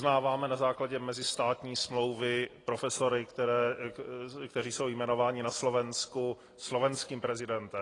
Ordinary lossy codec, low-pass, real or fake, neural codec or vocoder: AAC, 32 kbps; 10.8 kHz; real; none